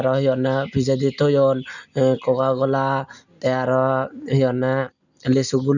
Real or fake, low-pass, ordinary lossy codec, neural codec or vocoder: real; 7.2 kHz; none; none